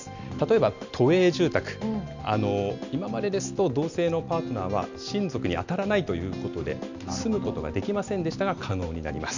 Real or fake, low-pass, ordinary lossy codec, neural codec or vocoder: real; 7.2 kHz; none; none